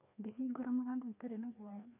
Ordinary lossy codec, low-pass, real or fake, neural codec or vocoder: none; 3.6 kHz; fake; codec, 16 kHz, 2 kbps, FreqCodec, larger model